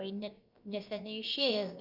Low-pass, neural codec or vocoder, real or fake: 5.4 kHz; codec, 16 kHz, about 1 kbps, DyCAST, with the encoder's durations; fake